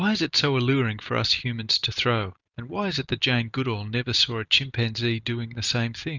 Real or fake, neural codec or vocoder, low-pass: real; none; 7.2 kHz